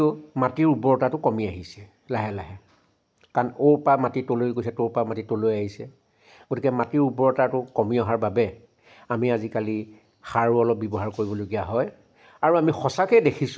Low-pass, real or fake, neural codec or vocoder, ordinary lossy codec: none; real; none; none